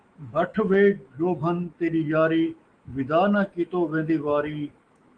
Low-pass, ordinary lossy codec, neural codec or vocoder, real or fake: 9.9 kHz; Opus, 24 kbps; autoencoder, 48 kHz, 128 numbers a frame, DAC-VAE, trained on Japanese speech; fake